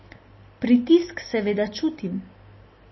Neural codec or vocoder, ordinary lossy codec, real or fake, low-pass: none; MP3, 24 kbps; real; 7.2 kHz